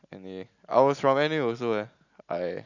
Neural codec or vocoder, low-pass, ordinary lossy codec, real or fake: none; 7.2 kHz; none; real